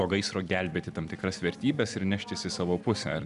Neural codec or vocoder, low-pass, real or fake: none; 10.8 kHz; real